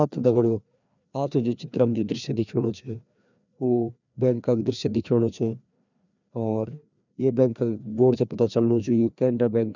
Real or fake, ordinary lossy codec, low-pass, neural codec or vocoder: fake; none; 7.2 kHz; codec, 16 kHz, 2 kbps, FreqCodec, larger model